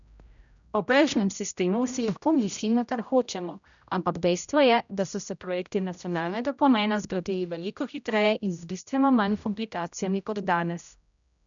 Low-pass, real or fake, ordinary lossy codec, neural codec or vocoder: 7.2 kHz; fake; none; codec, 16 kHz, 0.5 kbps, X-Codec, HuBERT features, trained on general audio